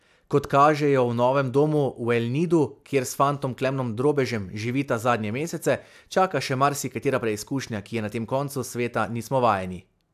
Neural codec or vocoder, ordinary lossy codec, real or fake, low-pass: none; AAC, 96 kbps; real; 14.4 kHz